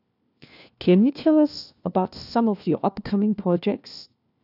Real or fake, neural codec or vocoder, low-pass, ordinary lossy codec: fake; codec, 16 kHz, 1 kbps, FunCodec, trained on LibriTTS, 50 frames a second; 5.4 kHz; none